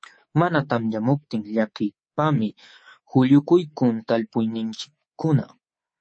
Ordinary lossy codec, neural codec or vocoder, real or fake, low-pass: MP3, 32 kbps; codec, 24 kHz, 3.1 kbps, DualCodec; fake; 9.9 kHz